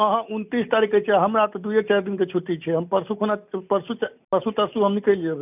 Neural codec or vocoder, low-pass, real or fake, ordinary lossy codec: none; 3.6 kHz; real; none